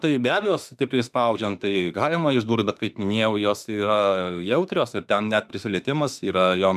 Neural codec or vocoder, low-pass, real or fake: autoencoder, 48 kHz, 32 numbers a frame, DAC-VAE, trained on Japanese speech; 14.4 kHz; fake